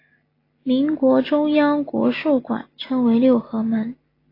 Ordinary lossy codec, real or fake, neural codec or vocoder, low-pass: AAC, 24 kbps; real; none; 5.4 kHz